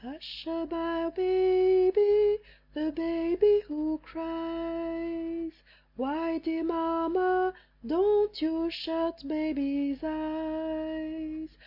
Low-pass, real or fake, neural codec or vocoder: 5.4 kHz; real; none